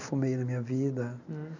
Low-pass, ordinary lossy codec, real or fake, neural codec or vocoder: 7.2 kHz; none; fake; vocoder, 44.1 kHz, 128 mel bands every 256 samples, BigVGAN v2